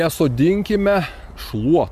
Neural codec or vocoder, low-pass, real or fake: none; 14.4 kHz; real